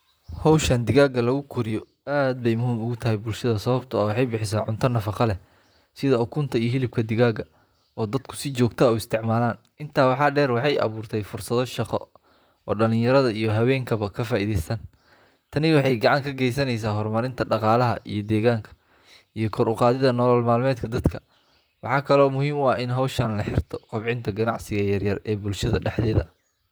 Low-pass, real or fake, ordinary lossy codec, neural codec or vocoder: none; fake; none; vocoder, 44.1 kHz, 128 mel bands, Pupu-Vocoder